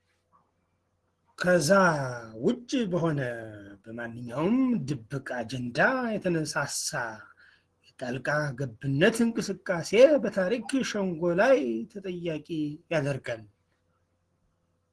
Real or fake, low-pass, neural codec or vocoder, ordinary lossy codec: real; 10.8 kHz; none; Opus, 16 kbps